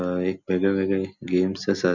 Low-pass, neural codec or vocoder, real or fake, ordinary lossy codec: 7.2 kHz; none; real; none